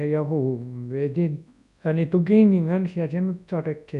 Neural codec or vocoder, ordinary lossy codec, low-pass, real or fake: codec, 24 kHz, 0.9 kbps, WavTokenizer, large speech release; none; 10.8 kHz; fake